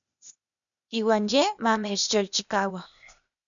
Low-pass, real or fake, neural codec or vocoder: 7.2 kHz; fake; codec, 16 kHz, 0.8 kbps, ZipCodec